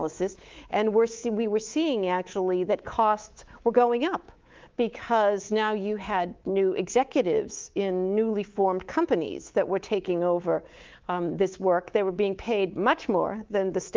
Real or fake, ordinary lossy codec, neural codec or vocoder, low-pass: fake; Opus, 32 kbps; codec, 24 kHz, 3.1 kbps, DualCodec; 7.2 kHz